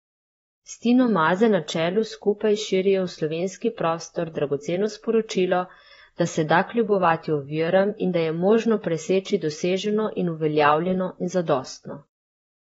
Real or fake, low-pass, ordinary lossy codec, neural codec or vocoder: fake; 19.8 kHz; AAC, 24 kbps; vocoder, 44.1 kHz, 128 mel bands, Pupu-Vocoder